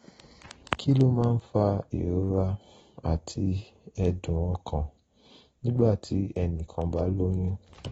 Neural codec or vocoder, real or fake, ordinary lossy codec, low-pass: none; real; AAC, 24 kbps; 14.4 kHz